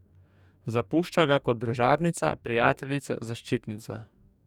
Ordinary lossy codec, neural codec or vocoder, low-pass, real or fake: none; codec, 44.1 kHz, 2.6 kbps, DAC; 19.8 kHz; fake